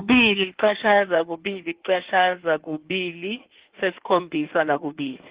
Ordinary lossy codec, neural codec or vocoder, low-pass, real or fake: Opus, 16 kbps; codec, 16 kHz in and 24 kHz out, 1.1 kbps, FireRedTTS-2 codec; 3.6 kHz; fake